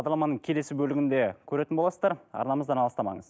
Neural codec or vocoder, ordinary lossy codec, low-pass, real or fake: none; none; none; real